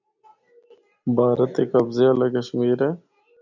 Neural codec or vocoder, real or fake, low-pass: none; real; 7.2 kHz